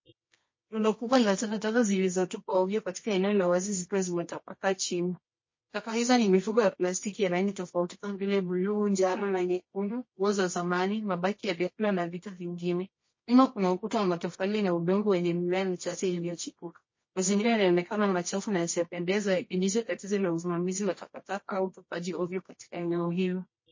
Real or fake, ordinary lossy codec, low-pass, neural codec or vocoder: fake; MP3, 32 kbps; 7.2 kHz; codec, 24 kHz, 0.9 kbps, WavTokenizer, medium music audio release